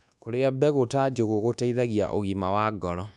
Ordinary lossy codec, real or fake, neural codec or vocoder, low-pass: none; fake; codec, 24 kHz, 1.2 kbps, DualCodec; none